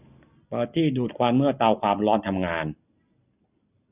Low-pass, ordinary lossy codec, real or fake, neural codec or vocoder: 3.6 kHz; none; real; none